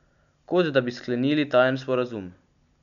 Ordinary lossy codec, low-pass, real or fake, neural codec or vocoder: none; 7.2 kHz; real; none